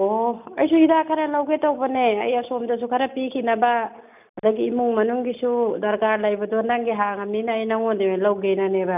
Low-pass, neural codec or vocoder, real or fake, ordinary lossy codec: 3.6 kHz; none; real; none